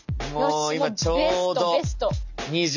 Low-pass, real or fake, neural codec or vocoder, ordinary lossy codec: 7.2 kHz; real; none; none